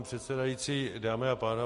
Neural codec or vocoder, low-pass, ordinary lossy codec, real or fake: none; 14.4 kHz; MP3, 48 kbps; real